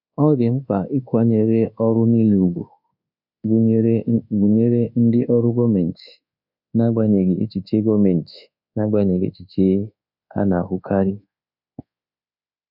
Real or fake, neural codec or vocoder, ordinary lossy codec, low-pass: fake; codec, 24 kHz, 1.2 kbps, DualCodec; none; 5.4 kHz